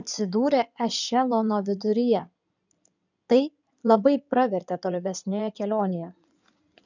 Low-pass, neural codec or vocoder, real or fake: 7.2 kHz; codec, 16 kHz in and 24 kHz out, 2.2 kbps, FireRedTTS-2 codec; fake